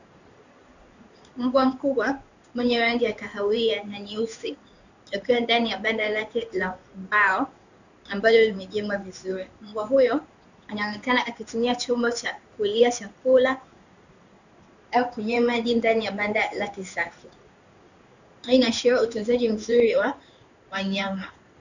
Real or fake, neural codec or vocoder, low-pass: fake; codec, 16 kHz in and 24 kHz out, 1 kbps, XY-Tokenizer; 7.2 kHz